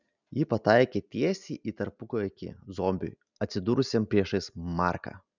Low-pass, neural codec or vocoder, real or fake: 7.2 kHz; none; real